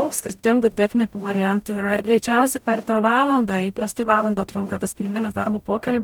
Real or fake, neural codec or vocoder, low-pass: fake; codec, 44.1 kHz, 0.9 kbps, DAC; 19.8 kHz